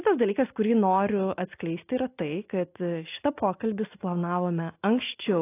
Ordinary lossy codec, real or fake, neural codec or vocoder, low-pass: AAC, 24 kbps; real; none; 3.6 kHz